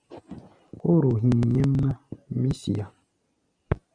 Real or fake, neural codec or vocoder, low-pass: real; none; 9.9 kHz